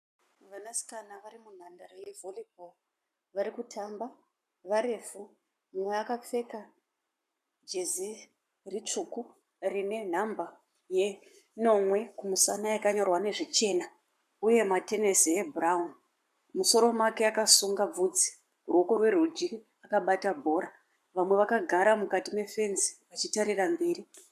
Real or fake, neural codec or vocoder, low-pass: fake; codec, 44.1 kHz, 7.8 kbps, Pupu-Codec; 14.4 kHz